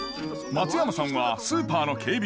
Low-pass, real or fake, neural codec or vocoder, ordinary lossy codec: none; real; none; none